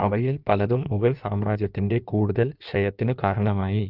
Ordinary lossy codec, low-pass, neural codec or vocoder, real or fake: Opus, 32 kbps; 5.4 kHz; codec, 16 kHz in and 24 kHz out, 1.1 kbps, FireRedTTS-2 codec; fake